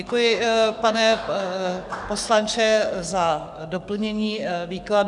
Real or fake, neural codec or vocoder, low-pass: fake; codec, 44.1 kHz, 7.8 kbps, DAC; 10.8 kHz